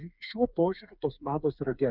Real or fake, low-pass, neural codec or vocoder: fake; 5.4 kHz; codec, 16 kHz, 4 kbps, FreqCodec, smaller model